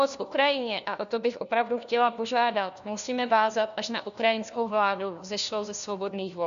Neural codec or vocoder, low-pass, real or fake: codec, 16 kHz, 1 kbps, FunCodec, trained on LibriTTS, 50 frames a second; 7.2 kHz; fake